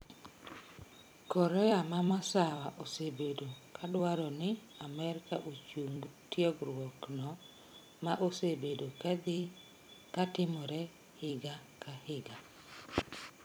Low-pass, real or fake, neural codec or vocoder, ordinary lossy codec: none; fake; vocoder, 44.1 kHz, 128 mel bands every 256 samples, BigVGAN v2; none